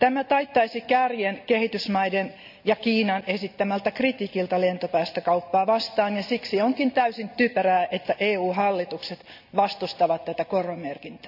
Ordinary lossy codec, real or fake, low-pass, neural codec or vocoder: none; real; 5.4 kHz; none